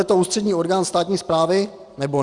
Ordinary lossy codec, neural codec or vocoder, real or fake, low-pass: Opus, 64 kbps; none; real; 10.8 kHz